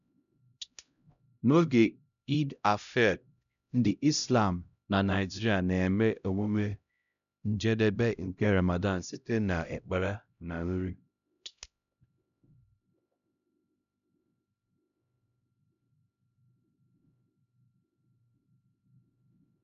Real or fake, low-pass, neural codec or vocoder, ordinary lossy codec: fake; 7.2 kHz; codec, 16 kHz, 0.5 kbps, X-Codec, HuBERT features, trained on LibriSpeech; none